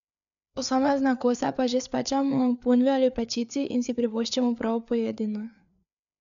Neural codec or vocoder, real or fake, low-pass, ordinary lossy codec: codec, 16 kHz, 4 kbps, FreqCodec, larger model; fake; 7.2 kHz; none